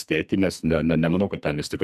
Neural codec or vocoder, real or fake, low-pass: codec, 44.1 kHz, 2.6 kbps, SNAC; fake; 14.4 kHz